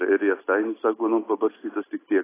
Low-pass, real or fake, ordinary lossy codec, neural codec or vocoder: 3.6 kHz; real; AAC, 16 kbps; none